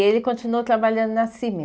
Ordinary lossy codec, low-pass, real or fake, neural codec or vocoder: none; none; real; none